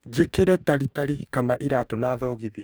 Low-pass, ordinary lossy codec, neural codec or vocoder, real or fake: none; none; codec, 44.1 kHz, 2.6 kbps, DAC; fake